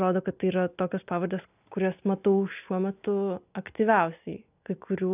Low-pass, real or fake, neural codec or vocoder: 3.6 kHz; real; none